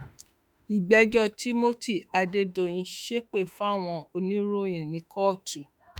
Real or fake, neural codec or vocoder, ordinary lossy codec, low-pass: fake; autoencoder, 48 kHz, 32 numbers a frame, DAC-VAE, trained on Japanese speech; none; none